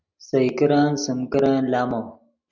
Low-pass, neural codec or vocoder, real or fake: 7.2 kHz; none; real